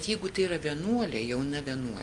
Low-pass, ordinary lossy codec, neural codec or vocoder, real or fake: 10.8 kHz; Opus, 32 kbps; none; real